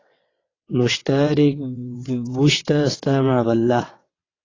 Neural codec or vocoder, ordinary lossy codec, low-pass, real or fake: vocoder, 22.05 kHz, 80 mel bands, WaveNeXt; AAC, 32 kbps; 7.2 kHz; fake